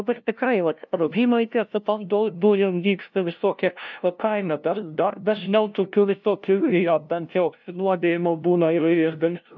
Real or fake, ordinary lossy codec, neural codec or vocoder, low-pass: fake; MP3, 64 kbps; codec, 16 kHz, 0.5 kbps, FunCodec, trained on LibriTTS, 25 frames a second; 7.2 kHz